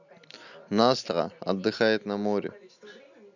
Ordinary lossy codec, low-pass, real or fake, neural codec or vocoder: none; 7.2 kHz; real; none